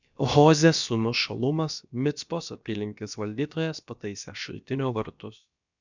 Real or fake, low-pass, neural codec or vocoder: fake; 7.2 kHz; codec, 16 kHz, about 1 kbps, DyCAST, with the encoder's durations